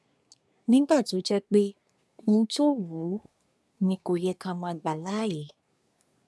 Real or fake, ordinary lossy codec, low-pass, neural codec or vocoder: fake; none; none; codec, 24 kHz, 1 kbps, SNAC